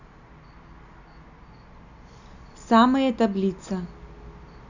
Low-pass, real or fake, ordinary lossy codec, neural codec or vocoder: 7.2 kHz; real; none; none